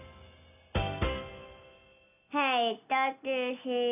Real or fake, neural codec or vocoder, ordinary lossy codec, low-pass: real; none; none; 3.6 kHz